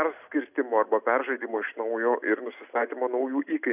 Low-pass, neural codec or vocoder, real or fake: 3.6 kHz; none; real